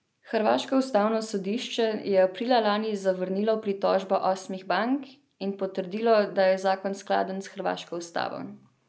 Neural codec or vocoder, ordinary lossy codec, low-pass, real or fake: none; none; none; real